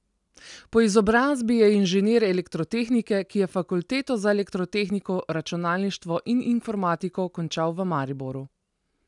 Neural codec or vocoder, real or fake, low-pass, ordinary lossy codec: none; real; 10.8 kHz; none